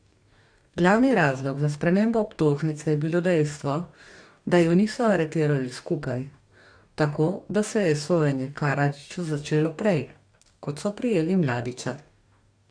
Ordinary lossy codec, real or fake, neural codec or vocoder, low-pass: AAC, 64 kbps; fake; codec, 44.1 kHz, 2.6 kbps, DAC; 9.9 kHz